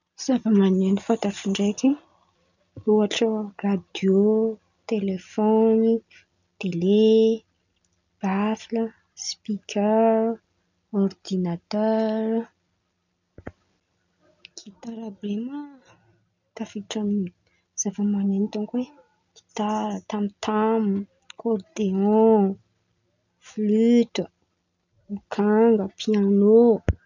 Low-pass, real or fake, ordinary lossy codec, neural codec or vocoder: 7.2 kHz; real; none; none